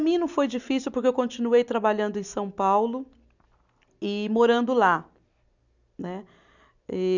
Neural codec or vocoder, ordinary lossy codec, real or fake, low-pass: none; none; real; 7.2 kHz